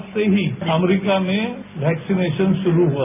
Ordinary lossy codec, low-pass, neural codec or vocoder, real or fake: none; 3.6 kHz; none; real